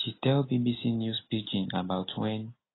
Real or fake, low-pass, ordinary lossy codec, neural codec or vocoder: real; 7.2 kHz; AAC, 16 kbps; none